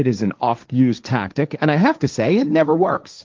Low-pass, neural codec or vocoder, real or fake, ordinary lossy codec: 7.2 kHz; codec, 16 kHz, 1.1 kbps, Voila-Tokenizer; fake; Opus, 32 kbps